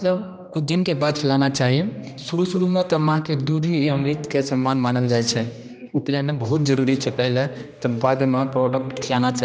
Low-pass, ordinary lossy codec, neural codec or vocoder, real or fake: none; none; codec, 16 kHz, 1 kbps, X-Codec, HuBERT features, trained on general audio; fake